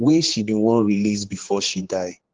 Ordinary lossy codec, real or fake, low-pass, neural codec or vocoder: Opus, 16 kbps; fake; 7.2 kHz; codec, 16 kHz, 4 kbps, X-Codec, HuBERT features, trained on general audio